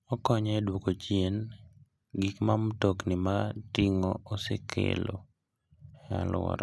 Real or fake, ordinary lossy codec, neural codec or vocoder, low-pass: real; none; none; none